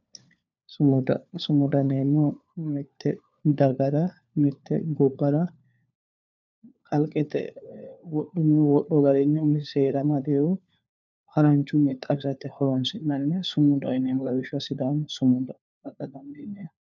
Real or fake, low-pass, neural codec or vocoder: fake; 7.2 kHz; codec, 16 kHz, 4 kbps, FunCodec, trained on LibriTTS, 50 frames a second